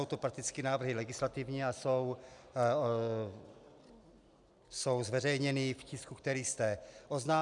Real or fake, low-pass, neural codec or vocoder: real; 10.8 kHz; none